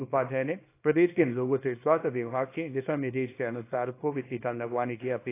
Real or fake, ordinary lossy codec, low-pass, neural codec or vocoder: fake; AAC, 24 kbps; 3.6 kHz; codec, 24 kHz, 0.9 kbps, WavTokenizer, small release